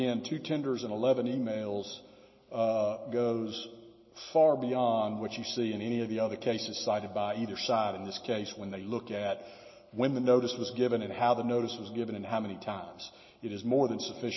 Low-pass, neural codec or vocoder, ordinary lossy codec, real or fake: 7.2 kHz; none; MP3, 24 kbps; real